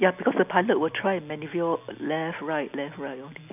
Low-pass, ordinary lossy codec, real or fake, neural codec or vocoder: 3.6 kHz; none; real; none